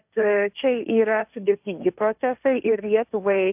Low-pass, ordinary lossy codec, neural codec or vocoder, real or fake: 3.6 kHz; AAC, 32 kbps; codec, 16 kHz, 1.1 kbps, Voila-Tokenizer; fake